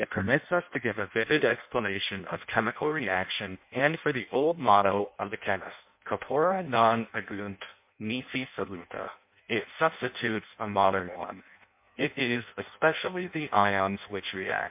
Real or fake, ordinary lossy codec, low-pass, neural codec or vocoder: fake; MP3, 32 kbps; 3.6 kHz; codec, 16 kHz in and 24 kHz out, 0.6 kbps, FireRedTTS-2 codec